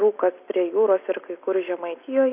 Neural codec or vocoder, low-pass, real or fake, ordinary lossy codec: none; 3.6 kHz; real; AAC, 32 kbps